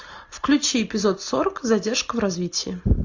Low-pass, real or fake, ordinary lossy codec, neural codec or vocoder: 7.2 kHz; real; MP3, 48 kbps; none